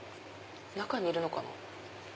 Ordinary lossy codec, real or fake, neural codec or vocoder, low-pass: none; real; none; none